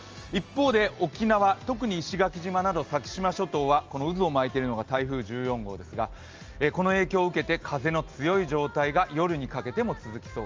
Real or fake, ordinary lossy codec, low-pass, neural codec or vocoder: real; Opus, 24 kbps; 7.2 kHz; none